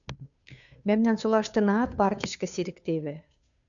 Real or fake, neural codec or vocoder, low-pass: fake; codec, 16 kHz, 2 kbps, FunCodec, trained on Chinese and English, 25 frames a second; 7.2 kHz